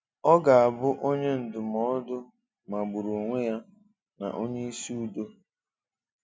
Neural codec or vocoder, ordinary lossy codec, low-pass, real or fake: none; none; none; real